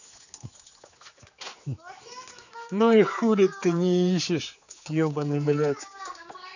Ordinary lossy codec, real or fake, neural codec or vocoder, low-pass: none; fake; codec, 16 kHz, 4 kbps, X-Codec, HuBERT features, trained on general audio; 7.2 kHz